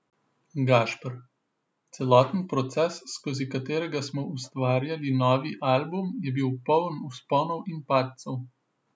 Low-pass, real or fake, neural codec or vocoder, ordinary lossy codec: none; real; none; none